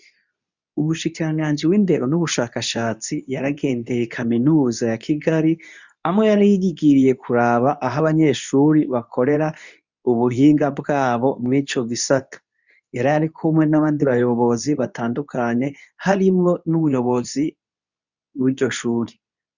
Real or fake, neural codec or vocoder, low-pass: fake; codec, 24 kHz, 0.9 kbps, WavTokenizer, medium speech release version 2; 7.2 kHz